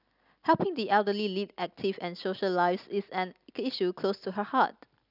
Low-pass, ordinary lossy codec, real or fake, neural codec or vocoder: 5.4 kHz; none; real; none